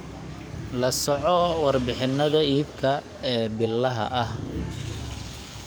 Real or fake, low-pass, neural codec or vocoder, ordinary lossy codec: fake; none; codec, 44.1 kHz, 7.8 kbps, DAC; none